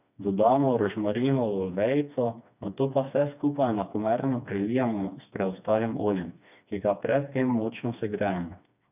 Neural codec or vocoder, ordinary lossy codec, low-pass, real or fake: codec, 16 kHz, 2 kbps, FreqCodec, smaller model; none; 3.6 kHz; fake